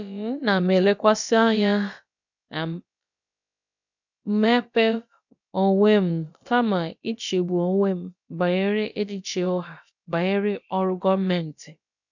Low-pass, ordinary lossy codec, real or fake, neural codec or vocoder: 7.2 kHz; none; fake; codec, 16 kHz, about 1 kbps, DyCAST, with the encoder's durations